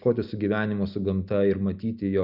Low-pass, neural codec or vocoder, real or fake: 5.4 kHz; none; real